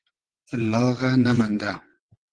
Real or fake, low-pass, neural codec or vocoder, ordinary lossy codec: fake; 9.9 kHz; vocoder, 22.05 kHz, 80 mel bands, WaveNeXt; Opus, 16 kbps